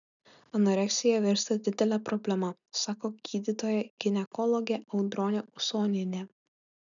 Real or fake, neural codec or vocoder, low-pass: real; none; 7.2 kHz